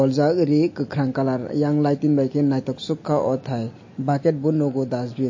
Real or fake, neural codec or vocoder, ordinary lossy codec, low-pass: real; none; MP3, 32 kbps; 7.2 kHz